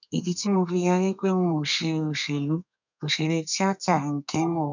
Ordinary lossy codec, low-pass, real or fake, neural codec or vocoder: none; 7.2 kHz; fake; codec, 32 kHz, 1.9 kbps, SNAC